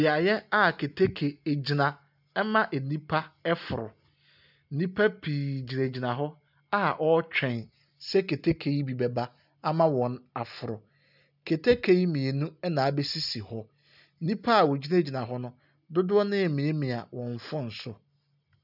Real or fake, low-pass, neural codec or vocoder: real; 5.4 kHz; none